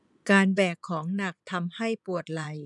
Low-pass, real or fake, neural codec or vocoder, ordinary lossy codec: 10.8 kHz; fake; vocoder, 24 kHz, 100 mel bands, Vocos; none